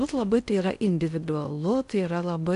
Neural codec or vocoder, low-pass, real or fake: codec, 16 kHz in and 24 kHz out, 0.6 kbps, FocalCodec, streaming, 4096 codes; 10.8 kHz; fake